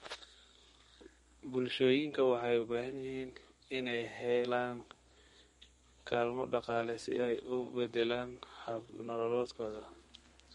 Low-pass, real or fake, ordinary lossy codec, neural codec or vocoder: 14.4 kHz; fake; MP3, 48 kbps; codec, 32 kHz, 1.9 kbps, SNAC